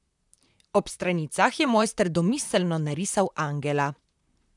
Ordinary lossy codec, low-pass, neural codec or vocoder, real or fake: none; 10.8 kHz; vocoder, 44.1 kHz, 128 mel bands, Pupu-Vocoder; fake